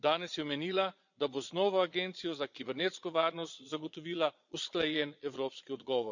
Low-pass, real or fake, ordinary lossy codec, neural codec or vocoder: 7.2 kHz; fake; none; vocoder, 22.05 kHz, 80 mel bands, Vocos